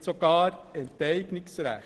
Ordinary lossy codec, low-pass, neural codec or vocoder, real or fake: Opus, 16 kbps; 10.8 kHz; none; real